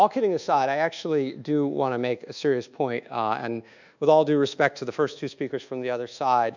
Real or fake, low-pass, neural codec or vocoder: fake; 7.2 kHz; codec, 24 kHz, 1.2 kbps, DualCodec